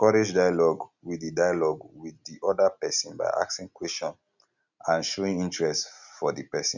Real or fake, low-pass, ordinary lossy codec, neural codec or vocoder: real; 7.2 kHz; none; none